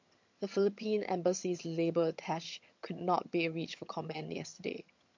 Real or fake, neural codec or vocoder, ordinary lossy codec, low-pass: fake; vocoder, 22.05 kHz, 80 mel bands, HiFi-GAN; MP3, 48 kbps; 7.2 kHz